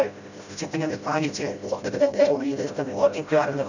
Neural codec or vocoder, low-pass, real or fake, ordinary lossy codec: codec, 16 kHz, 0.5 kbps, FreqCodec, smaller model; 7.2 kHz; fake; none